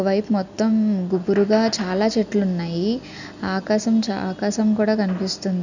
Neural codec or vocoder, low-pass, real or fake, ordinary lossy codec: none; 7.2 kHz; real; none